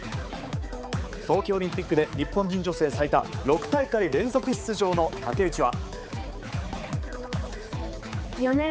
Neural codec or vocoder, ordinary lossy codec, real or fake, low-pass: codec, 16 kHz, 4 kbps, X-Codec, HuBERT features, trained on balanced general audio; none; fake; none